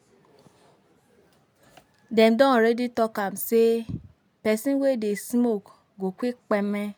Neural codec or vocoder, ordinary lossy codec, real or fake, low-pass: none; none; real; none